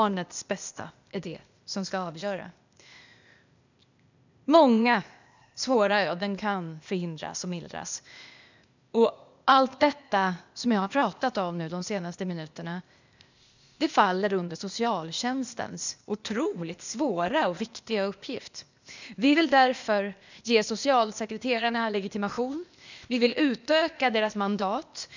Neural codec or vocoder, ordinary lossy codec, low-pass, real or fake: codec, 16 kHz, 0.8 kbps, ZipCodec; none; 7.2 kHz; fake